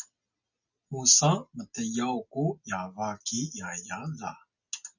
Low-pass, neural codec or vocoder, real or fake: 7.2 kHz; none; real